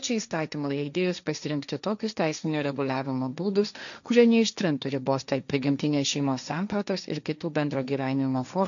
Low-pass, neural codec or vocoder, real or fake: 7.2 kHz; codec, 16 kHz, 1.1 kbps, Voila-Tokenizer; fake